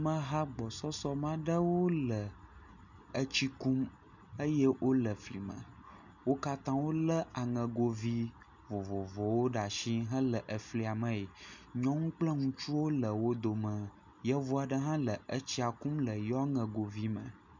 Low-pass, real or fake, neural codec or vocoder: 7.2 kHz; real; none